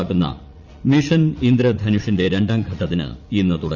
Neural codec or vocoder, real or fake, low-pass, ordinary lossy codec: vocoder, 44.1 kHz, 80 mel bands, Vocos; fake; 7.2 kHz; none